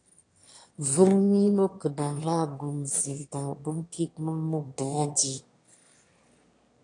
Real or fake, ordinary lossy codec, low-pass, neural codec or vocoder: fake; AAC, 48 kbps; 9.9 kHz; autoencoder, 22.05 kHz, a latent of 192 numbers a frame, VITS, trained on one speaker